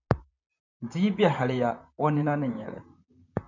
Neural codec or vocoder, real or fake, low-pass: vocoder, 44.1 kHz, 128 mel bands, Pupu-Vocoder; fake; 7.2 kHz